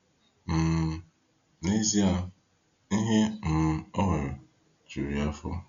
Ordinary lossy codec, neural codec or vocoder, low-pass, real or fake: Opus, 64 kbps; none; 7.2 kHz; real